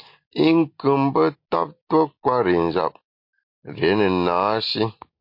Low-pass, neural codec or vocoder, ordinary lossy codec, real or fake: 5.4 kHz; none; MP3, 32 kbps; real